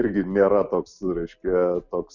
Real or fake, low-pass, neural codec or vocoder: real; 7.2 kHz; none